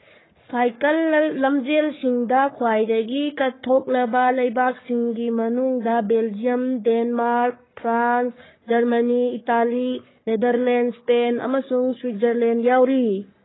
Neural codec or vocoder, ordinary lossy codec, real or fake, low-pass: codec, 44.1 kHz, 3.4 kbps, Pupu-Codec; AAC, 16 kbps; fake; 7.2 kHz